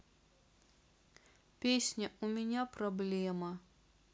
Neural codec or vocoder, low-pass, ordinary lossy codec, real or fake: none; none; none; real